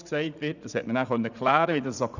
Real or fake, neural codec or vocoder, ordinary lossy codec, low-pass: fake; vocoder, 44.1 kHz, 80 mel bands, Vocos; none; 7.2 kHz